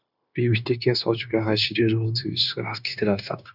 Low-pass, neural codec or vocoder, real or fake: 5.4 kHz; codec, 16 kHz, 0.9 kbps, LongCat-Audio-Codec; fake